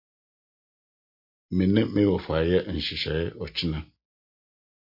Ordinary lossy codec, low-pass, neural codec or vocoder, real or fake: MP3, 32 kbps; 5.4 kHz; none; real